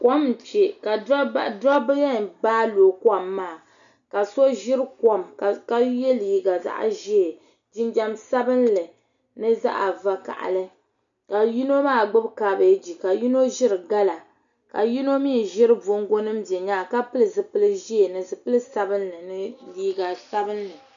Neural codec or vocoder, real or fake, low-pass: none; real; 7.2 kHz